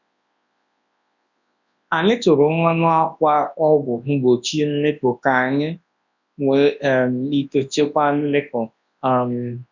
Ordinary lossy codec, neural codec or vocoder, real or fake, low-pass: none; codec, 24 kHz, 0.9 kbps, WavTokenizer, large speech release; fake; 7.2 kHz